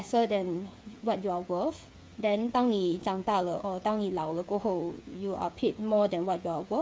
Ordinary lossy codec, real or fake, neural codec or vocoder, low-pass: none; fake; codec, 16 kHz, 8 kbps, FreqCodec, smaller model; none